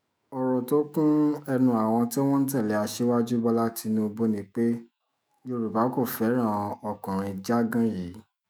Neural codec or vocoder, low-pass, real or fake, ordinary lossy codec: autoencoder, 48 kHz, 128 numbers a frame, DAC-VAE, trained on Japanese speech; none; fake; none